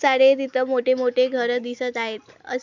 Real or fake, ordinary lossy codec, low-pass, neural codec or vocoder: real; MP3, 64 kbps; 7.2 kHz; none